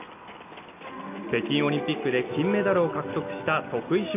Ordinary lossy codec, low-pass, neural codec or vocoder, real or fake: none; 3.6 kHz; none; real